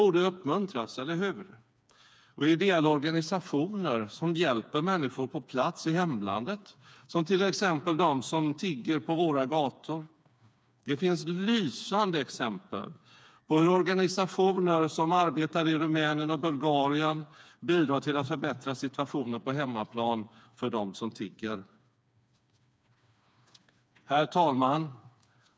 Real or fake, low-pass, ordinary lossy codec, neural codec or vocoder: fake; none; none; codec, 16 kHz, 4 kbps, FreqCodec, smaller model